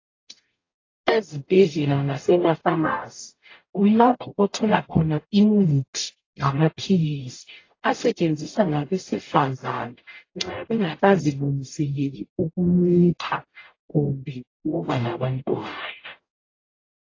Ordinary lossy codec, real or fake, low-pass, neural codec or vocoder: AAC, 32 kbps; fake; 7.2 kHz; codec, 44.1 kHz, 0.9 kbps, DAC